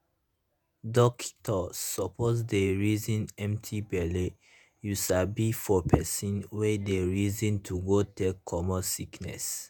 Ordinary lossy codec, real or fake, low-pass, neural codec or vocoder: none; fake; none; vocoder, 48 kHz, 128 mel bands, Vocos